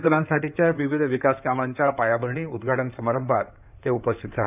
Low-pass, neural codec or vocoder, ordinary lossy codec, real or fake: 3.6 kHz; codec, 16 kHz in and 24 kHz out, 2.2 kbps, FireRedTTS-2 codec; none; fake